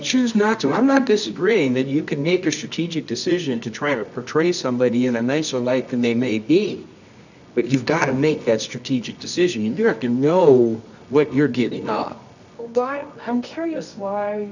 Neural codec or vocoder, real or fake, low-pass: codec, 24 kHz, 0.9 kbps, WavTokenizer, medium music audio release; fake; 7.2 kHz